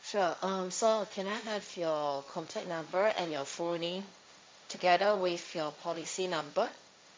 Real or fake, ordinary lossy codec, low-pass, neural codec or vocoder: fake; none; none; codec, 16 kHz, 1.1 kbps, Voila-Tokenizer